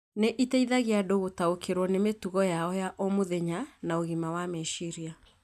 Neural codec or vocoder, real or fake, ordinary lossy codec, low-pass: none; real; none; 14.4 kHz